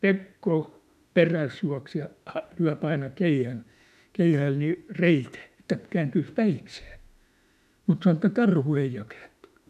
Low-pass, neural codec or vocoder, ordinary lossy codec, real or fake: 14.4 kHz; autoencoder, 48 kHz, 32 numbers a frame, DAC-VAE, trained on Japanese speech; none; fake